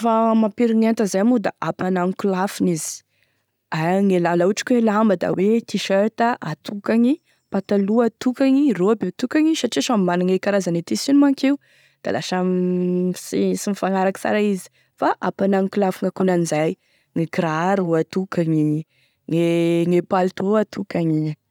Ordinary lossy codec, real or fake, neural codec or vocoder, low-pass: none; real; none; 19.8 kHz